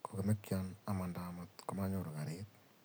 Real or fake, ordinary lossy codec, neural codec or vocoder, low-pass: real; none; none; none